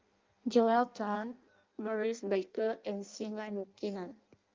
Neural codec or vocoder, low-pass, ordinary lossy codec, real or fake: codec, 16 kHz in and 24 kHz out, 0.6 kbps, FireRedTTS-2 codec; 7.2 kHz; Opus, 24 kbps; fake